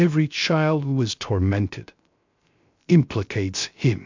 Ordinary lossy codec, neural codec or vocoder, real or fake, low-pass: MP3, 64 kbps; codec, 16 kHz, 0.3 kbps, FocalCodec; fake; 7.2 kHz